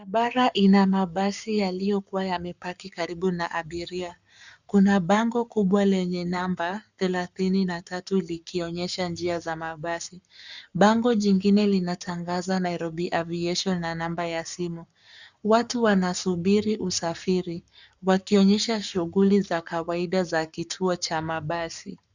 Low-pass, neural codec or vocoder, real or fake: 7.2 kHz; codec, 44.1 kHz, 7.8 kbps, Pupu-Codec; fake